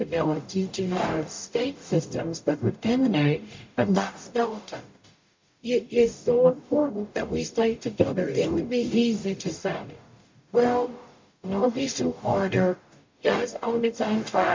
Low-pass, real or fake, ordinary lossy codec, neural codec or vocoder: 7.2 kHz; fake; MP3, 48 kbps; codec, 44.1 kHz, 0.9 kbps, DAC